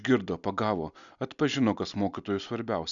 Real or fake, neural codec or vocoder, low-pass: real; none; 7.2 kHz